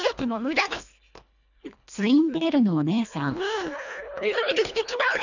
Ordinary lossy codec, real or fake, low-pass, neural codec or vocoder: none; fake; 7.2 kHz; codec, 24 kHz, 1.5 kbps, HILCodec